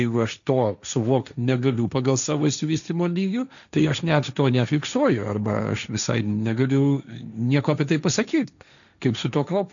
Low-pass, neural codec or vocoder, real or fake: 7.2 kHz; codec, 16 kHz, 1.1 kbps, Voila-Tokenizer; fake